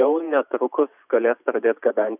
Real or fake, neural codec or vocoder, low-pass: fake; vocoder, 44.1 kHz, 128 mel bands every 512 samples, BigVGAN v2; 3.6 kHz